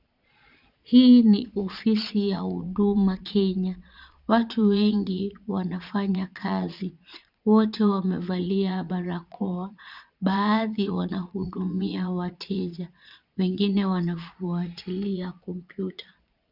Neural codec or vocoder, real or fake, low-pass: vocoder, 22.05 kHz, 80 mel bands, Vocos; fake; 5.4 kHz